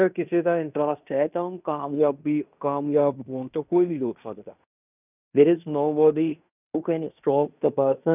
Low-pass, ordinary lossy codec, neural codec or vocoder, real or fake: 3.6 kHz; none; codec, 16 kHz in and 24 kHz out, 0.9 kbps, LongCat-Audio-Codec, fine tuned four codebook decoder; fake